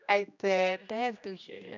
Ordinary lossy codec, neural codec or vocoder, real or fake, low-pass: none; codec, 16 kHz, 1 kbps, X-Codec, HuBERT features, trained on general audio; fake; 7.2 kHz